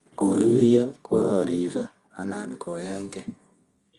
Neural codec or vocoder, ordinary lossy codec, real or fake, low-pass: codec, 24 kHz, 0.9 kbps, WavTokenizer, medium music audio release; Opus, 32 kbps; fake; 10.8 kHz